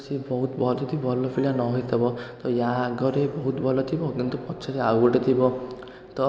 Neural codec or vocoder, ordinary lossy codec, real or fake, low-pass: none; none; real; none